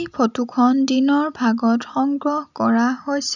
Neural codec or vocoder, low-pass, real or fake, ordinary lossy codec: none; 7.2 kHz; real; none